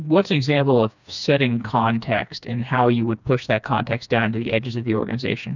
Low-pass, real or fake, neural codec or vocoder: 7.2 kHz; fake; codec, 16 kHz, 2 kbps, FreqCodec, smaller model